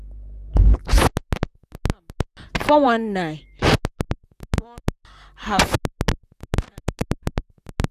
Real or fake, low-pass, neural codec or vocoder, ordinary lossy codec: fake; 14.4 kHz; vocoder, 44.1 kHz, 128 mel bands, Pupu-Vocoder; none